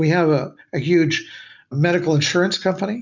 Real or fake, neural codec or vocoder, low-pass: real; none; 7.2 kHz